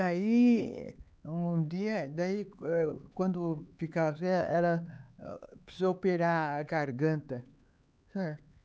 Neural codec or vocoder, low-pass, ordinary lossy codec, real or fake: codec, 16 kHz, 4 kbps, X-Codec, HuBERT features, trained on LibriSpeech; none; none; fake